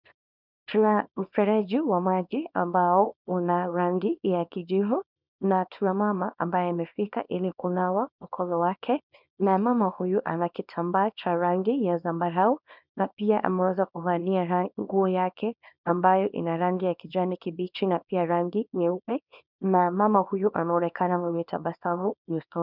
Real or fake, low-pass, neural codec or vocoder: fake; 5.4 kHz; codec, 24 kHz, 0.9 kbps, WavTokenizer, small release